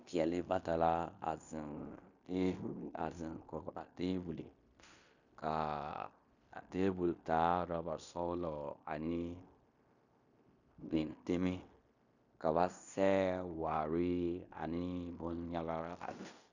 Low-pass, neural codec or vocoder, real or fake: 7.2 kHz; codec, 16 kHz in and 24 kHz out, 0.9 kbps, LongCat-Audio-Codec, fine tuned four codebook decoder; fake